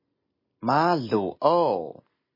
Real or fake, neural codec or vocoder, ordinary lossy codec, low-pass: real; none; MP3, 24 kbps; 5.4 kHz